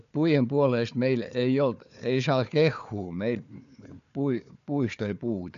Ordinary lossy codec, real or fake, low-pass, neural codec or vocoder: none; fake; 7.2 kHz; codec, 16 kHz, 4 kbps, FunCodec, trained on Chinese and English, 50 frames a second